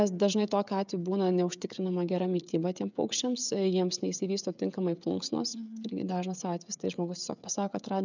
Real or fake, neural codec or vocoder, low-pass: fake; codec, 16 kHz, 16 kbps, FreqCodec, smaller model; 7.2 kHz